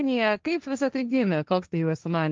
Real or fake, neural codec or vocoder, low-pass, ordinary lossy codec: fake; codec, 16 kHz, 1.1 kbps, Voila-Tokenizer; 7.2 kHz; Opus, 24 kbps